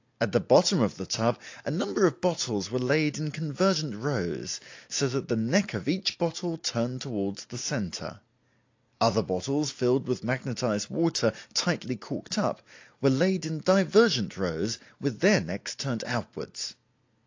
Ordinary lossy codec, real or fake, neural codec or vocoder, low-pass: AAC, 48 kbps; real; none; 7.2 kHz